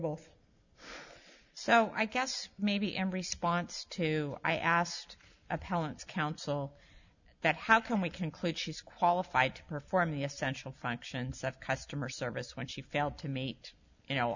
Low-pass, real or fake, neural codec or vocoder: 7.2 kHz; real; none